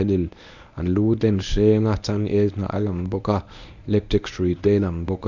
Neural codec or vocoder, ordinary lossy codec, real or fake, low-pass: codec, 24 kHz, 0.9 kbps, WavTokenizer, medium speech release version 1; none; fake; 7.2 kHz